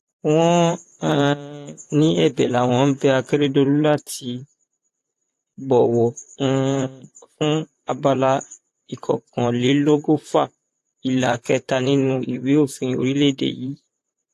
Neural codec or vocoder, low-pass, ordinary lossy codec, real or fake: vocoder, 44.1 kHz, 128 mel bands, Pupu-Vocoder; 14.4 kHz; AAC, 64 kbps; fake